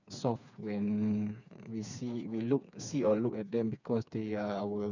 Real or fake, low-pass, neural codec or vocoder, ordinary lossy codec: fake; 7.2 kHz; codec, 16 kHz, 4 kbps, FreqCodec, smaller model; none